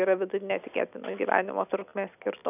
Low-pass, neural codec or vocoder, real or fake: 3.6 kHz; none; real